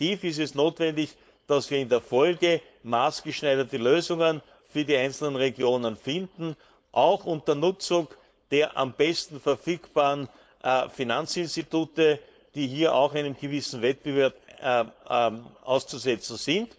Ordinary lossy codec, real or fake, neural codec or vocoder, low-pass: none; fake; codec, 16 kHz, 4.8 kbps, FACodec; none